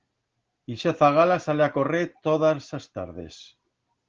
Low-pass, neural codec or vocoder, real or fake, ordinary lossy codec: 7.2 kHz; none; real; Opus, 16 kbps